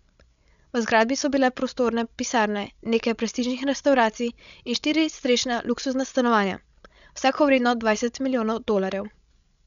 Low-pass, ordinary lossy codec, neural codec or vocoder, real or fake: 7.2 kHz; none; codec, 16 kHz, 16 kbps, FreqCodec, larger model; fake